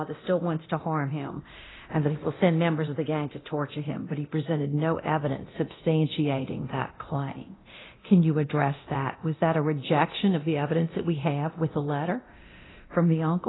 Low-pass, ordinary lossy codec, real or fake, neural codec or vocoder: 7.2 kHz; AAC, 16 kbps; fake; codec, 24 kHz, 0.9 kbps, DualCodec